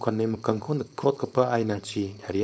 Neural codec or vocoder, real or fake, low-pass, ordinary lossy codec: codec, 16 kHz, 4.8 kbps, FACodec; fake; none; none